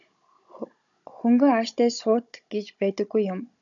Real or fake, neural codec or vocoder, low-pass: fake; codec, 16 kHz, 16 kbps, FunCodec, trained on Chinese and English, 50 frames a second; 7.2 kHz